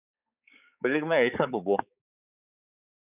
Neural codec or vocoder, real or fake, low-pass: codec, 16 kHz, 4 kbps, X-Codec, HuBERT features, trained on balanced general audio; fake; 3.6 kHz